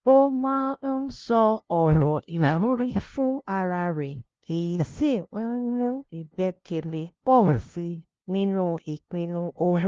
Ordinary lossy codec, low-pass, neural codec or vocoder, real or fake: Opus, 16 kbps; 7.2 kHz; codec, 16 kHz, 0.5 kbps, FunCodec, trained on LibriTTS, 25 frames a second; fake